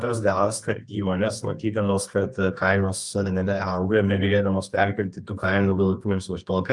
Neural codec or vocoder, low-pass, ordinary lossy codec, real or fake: codec, 24 kHz, 0.9 kbps, WavTokenizer, medium music audio release; 10.8 kHz; Opus, 32 kbps; fake